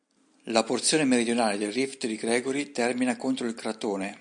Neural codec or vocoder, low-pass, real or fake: none; 9.9 kHz; real